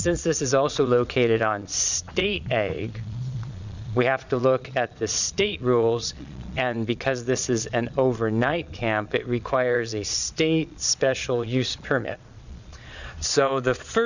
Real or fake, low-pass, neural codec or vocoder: fake; 7.2 kHz; vocoder, 22.05 kHz, 80 mel bands, Vocos